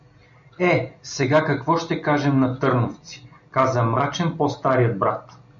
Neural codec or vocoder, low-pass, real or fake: none; 7.2 kHz; real